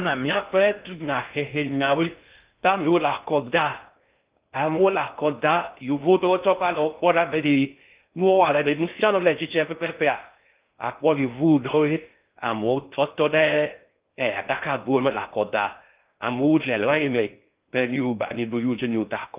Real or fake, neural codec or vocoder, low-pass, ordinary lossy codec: fake; codec, 16 kHz in and 24 kHz out, 0.6 kbps, FocalCodec, streaming, 2048 codes; 3.6 kHz; Opus, 24 kbps